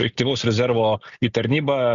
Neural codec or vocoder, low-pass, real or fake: none; 7.2 kHz; real